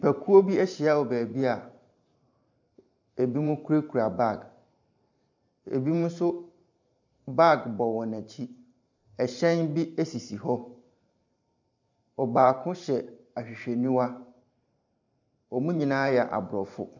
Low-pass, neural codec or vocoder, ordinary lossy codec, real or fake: 7.2 kHz; vocoder, 44.1 kHz, 128 mel bands every 512 samples, BigVGAN v2; MP3, 64 kbps; fake